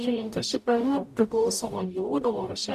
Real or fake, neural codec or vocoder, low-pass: fake; codec, 44.1 kHz, 0.9 kbps, DAC; 14.4 kHz